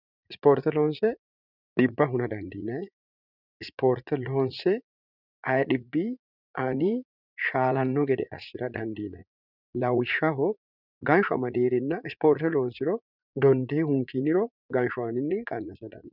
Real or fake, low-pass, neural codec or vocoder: fake; 5.4 kHz; vocoder, 44.1 kHz, 80 mel bands, Vocos